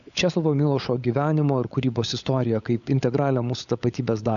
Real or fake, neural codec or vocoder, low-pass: fake; codec, 16 kHz, 16 kbps, FunCodec, trained on LibriTTS, 50 frames a second; 7.2 kHz